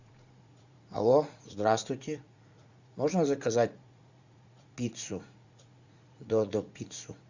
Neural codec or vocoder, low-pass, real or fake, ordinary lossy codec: vocoder, 44.1 kHz, 80 mel bands, Vocos; 7.2 kHz; fake; Opus, 64 kbps